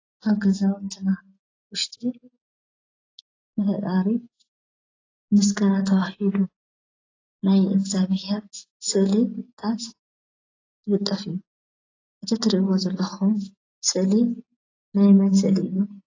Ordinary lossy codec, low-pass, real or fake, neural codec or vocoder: AAC, 32 kbps; 7.2 kHz; real; none